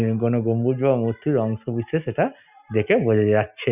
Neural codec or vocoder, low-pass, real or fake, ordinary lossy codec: none; 3.6 kHz; real; none